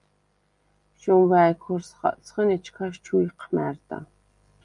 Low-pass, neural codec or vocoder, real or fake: 10.8 kHz; none; real